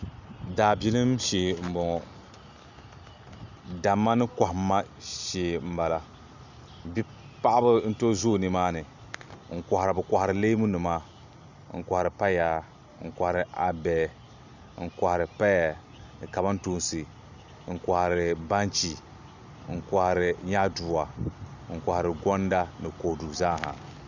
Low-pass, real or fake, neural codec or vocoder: 7.2 kHz; real; none